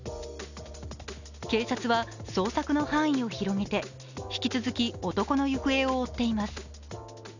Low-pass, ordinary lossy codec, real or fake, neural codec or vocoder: 7.2 kHz; none; real; none